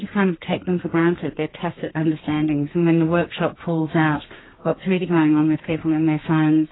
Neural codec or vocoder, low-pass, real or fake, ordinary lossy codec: codec, 32 kHz, 1.9 kbps, SNAC; 7.2 kHz; fake; AAC, 16 kbps